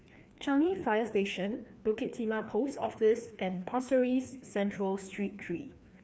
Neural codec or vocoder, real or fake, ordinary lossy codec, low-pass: codec, 16 kHz, 2 kbps, FreqCodec, larger model; fake; none; none